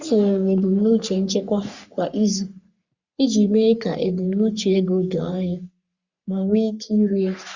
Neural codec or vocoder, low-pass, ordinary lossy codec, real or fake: codec, 44.1 kHz, 3.4 kbps, Pupu-Codec; 7.2 kHz; Opus, 64 kbps; fake